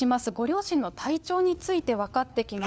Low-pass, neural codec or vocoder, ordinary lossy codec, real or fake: none; codec, 16 kHz, 4 kbps, FunCodec, trained on LibriTTS, 50 frames a second; none; fake